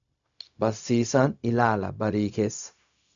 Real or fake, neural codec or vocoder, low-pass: fake; codec, 16 kHz, 0.4 kbps, LongCat-Audio-Codec; 7.2 kHz